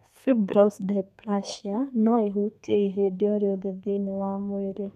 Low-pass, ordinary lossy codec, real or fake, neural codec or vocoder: 14.4 kHz; none; fake; codec, 32 kHz, 1.9 kbps, SNAC